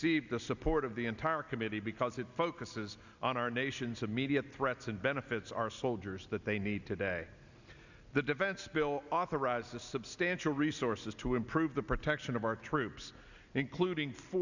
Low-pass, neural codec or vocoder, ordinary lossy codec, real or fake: 7.2 kHz; none; Opus, 64 kbps; real